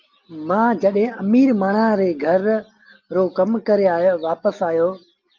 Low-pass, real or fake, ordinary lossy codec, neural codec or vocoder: 7.2 kHz; real; Opus, 32 kbps; none